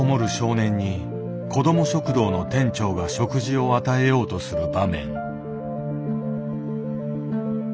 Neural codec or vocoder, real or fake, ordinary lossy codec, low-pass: none; real; none; none